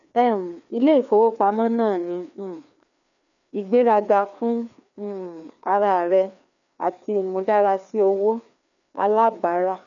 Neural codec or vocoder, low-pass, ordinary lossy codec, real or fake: codec, 16 kHz, 2 kbps, FreqCodec, larger model; 7.2 kHz; none; fake